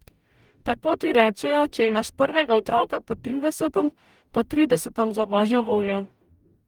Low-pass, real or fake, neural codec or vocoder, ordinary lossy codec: 19.8 kHz; fake; codec, 44.1 kHz, 0.9 kbps, DAC; Opus, 32 kbps